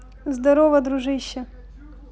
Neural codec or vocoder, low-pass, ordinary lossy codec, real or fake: none; none; none; real